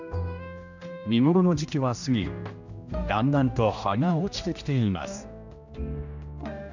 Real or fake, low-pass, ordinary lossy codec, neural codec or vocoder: fake; 7.2 kHz; none; codec, 16 kHz, 1 kbps, X-Codec, HuBERT features, trained on general audio